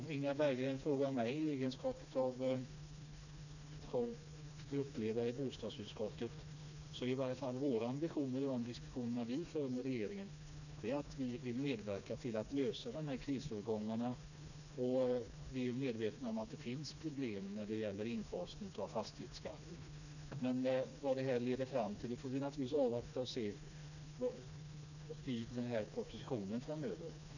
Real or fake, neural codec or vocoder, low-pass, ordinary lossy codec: fake; codec, 16 kHz, 2 kbps, FreqCodec, smaller model; 7.2 kHz; none